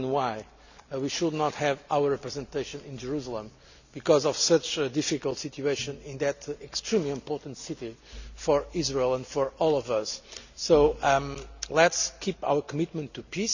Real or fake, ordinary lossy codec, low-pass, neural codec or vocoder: real; none; 7.2 kHz; none